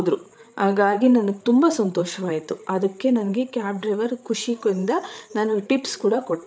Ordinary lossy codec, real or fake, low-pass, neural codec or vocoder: none; fake; none; codec, 16 kHz, 8 kbps, FreqCodec, larger model